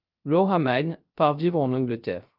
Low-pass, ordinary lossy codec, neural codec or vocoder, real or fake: 5.4 kHz; Opus, 24 kbps; codec, 16 kHz, 0.3 kbps, FocalCodec; fake